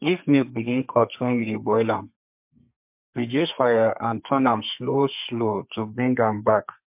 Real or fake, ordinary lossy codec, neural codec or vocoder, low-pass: fake; MP3, 32 kbps; codec, 32 kHz, 1.9 kbps, SNAC; 3.6 kHz